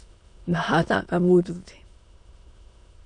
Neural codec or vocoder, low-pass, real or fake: autoencoder, 22.05 kHz, a latent of 192 numbers a frame, VITS, trained on many speakers; 9.9 kHz; fake